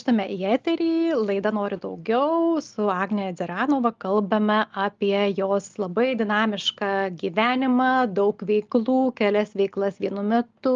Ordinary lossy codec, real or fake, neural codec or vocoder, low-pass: Opus, 32 kbps; real; none; 7.2 kHz